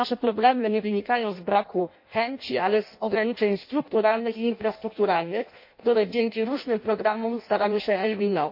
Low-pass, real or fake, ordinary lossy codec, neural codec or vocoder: 5.4 kHz; fake; MP3, 32 kbps; codec, 16 kHz in and 24 kHz out, 0.6 kbps, FireRedTTS-2 codec